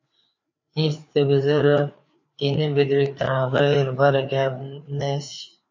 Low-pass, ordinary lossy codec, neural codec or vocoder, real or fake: 7.2 kHz; MP3, 48 kbps; codec, 16 kHz, 4 kbps, FreqCodec, larger model; fake